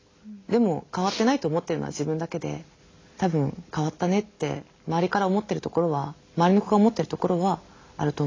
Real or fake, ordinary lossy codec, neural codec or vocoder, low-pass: real; AAC, 32 kbps; none; 7.2 kHz